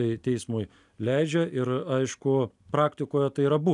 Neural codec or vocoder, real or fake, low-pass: none; real; 10.8 kHz